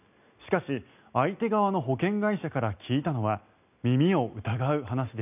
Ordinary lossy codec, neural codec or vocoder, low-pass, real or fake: none; none; 3.6 kHz; real